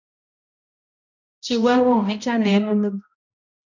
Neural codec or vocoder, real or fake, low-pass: codec, 16 kHz, 0.5 kbps, X-Codec, HuBERT features, trained on balanced general audio; fake; 7.2 kHz